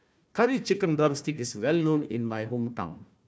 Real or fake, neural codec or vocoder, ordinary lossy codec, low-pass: fake; codec, 16 kHz, 1 kbps, FunCodec, trained on Chinese and English, 50 frames a second; none; none